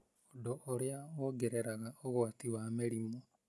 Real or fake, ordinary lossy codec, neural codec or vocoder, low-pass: real; none; none; none